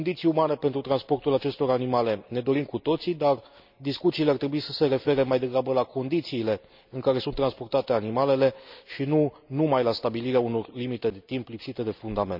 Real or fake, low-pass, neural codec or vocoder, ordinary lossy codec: real; 5.4 kHz; none; none